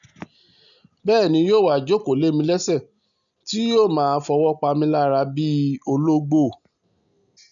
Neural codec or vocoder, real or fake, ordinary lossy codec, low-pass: none; real; none; 7.2 kHz